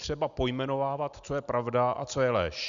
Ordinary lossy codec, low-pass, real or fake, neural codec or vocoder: Opus, 64 kbps; 7.2 kHz; real; none